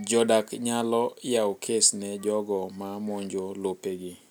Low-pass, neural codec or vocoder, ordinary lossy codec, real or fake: none; none; none; real